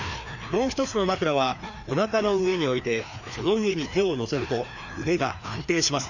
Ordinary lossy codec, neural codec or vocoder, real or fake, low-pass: none; codec, 16 kHz, 2 kbps, FreqCodec, larger model; fake; 7.2 kHz